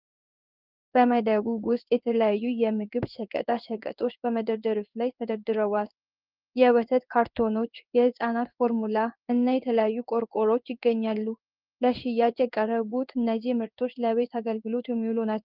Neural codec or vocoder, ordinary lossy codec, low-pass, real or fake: codec, 16 kHz in and 24 kHz out, 1 kbps, XY-Tokenizer; Opus, 24 kbps; 5.4 kHz; fake